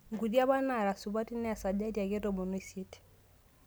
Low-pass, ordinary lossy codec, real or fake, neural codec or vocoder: none; none; real; none